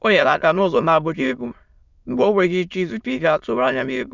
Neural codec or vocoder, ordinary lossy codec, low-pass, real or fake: autoencoder, 22.05 kHz, a latent of 192 numbers a frame, VITS, trained on many speakers; none; 7.2 kHz; fake